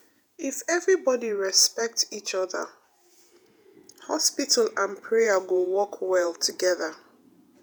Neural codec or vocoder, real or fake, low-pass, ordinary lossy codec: vocoder, 48 kHz, 128 mel bands, Vocos; fake; none; none